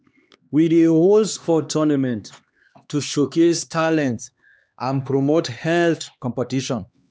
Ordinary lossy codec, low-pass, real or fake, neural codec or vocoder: none; none; fake; codec, 16 kHz, 2 kbps, X-Codec, HuBERT features, trained on LibriSpeech